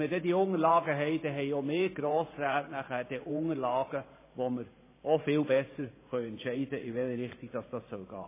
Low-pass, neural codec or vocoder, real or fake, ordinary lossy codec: 3.6 kHz; vocoder, 44.1 kHz, 128 mel bands every 512 samples, BigVGAN v2; fake; MP3, 16 kbps